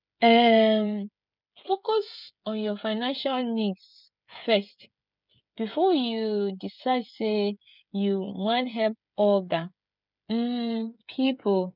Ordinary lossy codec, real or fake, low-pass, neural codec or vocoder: none; fake; 5.4 kHz; codec, 16 kHz, 16 kbps, FreqCodec, smaller model